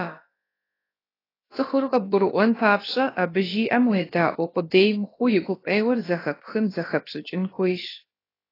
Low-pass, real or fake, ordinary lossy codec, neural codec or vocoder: 5.4 kHz; fake; AAC, 24 kbps; codec, 16 kHz, about 1 kbps, DyCAST, with the encoder's durations